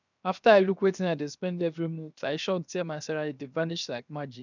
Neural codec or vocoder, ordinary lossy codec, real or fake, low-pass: codec, 16 kHz, 0.7 kbps, FocalCodec; none; fake; 7.2 kHz